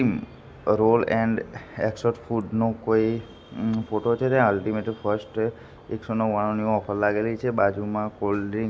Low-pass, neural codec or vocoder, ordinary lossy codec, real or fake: none; none; none; real